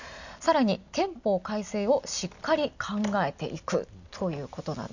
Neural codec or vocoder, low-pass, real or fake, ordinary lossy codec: none; 7.2 kHz; real; none